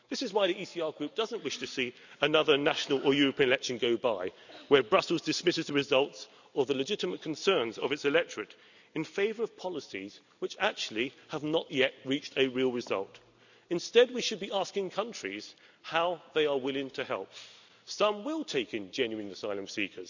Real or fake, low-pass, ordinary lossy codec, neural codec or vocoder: real; 7.2 kHz; none; none